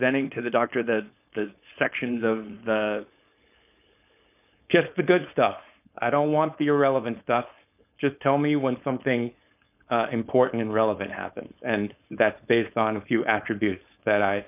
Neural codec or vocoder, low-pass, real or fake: codec, 16 kHz, 4.8 kbps, FACodec; 3.6 kHz; fake